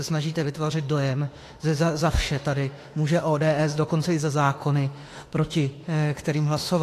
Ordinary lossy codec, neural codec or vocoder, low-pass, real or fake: AAC, 48 kbps; autoencoder, 48 kHz, 32 numbers a frame, DAC-VAE, trained on Japanese speech; 14.4 kHz; fake